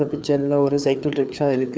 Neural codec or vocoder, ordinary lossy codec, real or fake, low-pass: codec, 16 kHz, 4 kbps, FreqCodec, larger model; none; fake; none